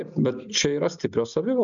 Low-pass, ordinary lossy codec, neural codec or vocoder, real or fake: 7.2 kHz; MP3, 96 kbps; none; real